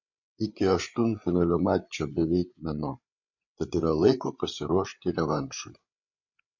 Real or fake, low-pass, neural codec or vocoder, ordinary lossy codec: fake; 7.2 kHz; codec, 16 kHz, 8 kbps, FreqCodec, larger model; MP3, 48 kbps